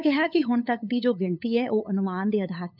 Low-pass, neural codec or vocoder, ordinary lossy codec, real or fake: 5.4 kHz; codec, 16 kHz, 8 kbps, FunCodec, trained on LibriTTS, 25 frames a second; none; fake